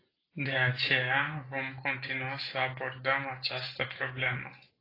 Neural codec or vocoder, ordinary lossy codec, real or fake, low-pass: none; AAC, 24 kbps; real; 5.4 kHz